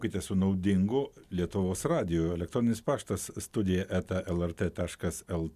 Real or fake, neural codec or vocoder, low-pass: real; none; 14.4 kHz